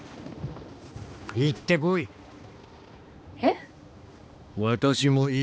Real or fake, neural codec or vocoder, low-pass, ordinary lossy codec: fake; codec, 16 kHz, 2 kbps, X-Codec, HuBERT features, trained on balanced general audio; none; none